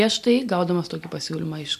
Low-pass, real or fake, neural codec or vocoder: 14.4 kHz; real; none